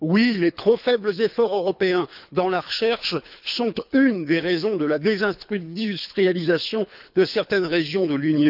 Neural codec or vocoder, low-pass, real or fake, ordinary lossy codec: codec, 24 kHz, 3 kbps, HILCodec; 5.4 kHz; fake; none